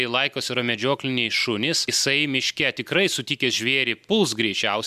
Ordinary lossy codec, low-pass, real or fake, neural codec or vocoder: MP3, 96 kbps; 14.4 kHz; real; none